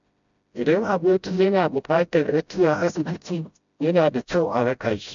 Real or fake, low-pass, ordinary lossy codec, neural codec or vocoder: fake; 7.2 kHz; MP3, 64 kbps; codec, 16 kHz, 0.5 kbps, FreqCodec, smaller model